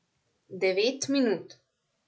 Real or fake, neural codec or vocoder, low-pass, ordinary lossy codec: real; none; none; none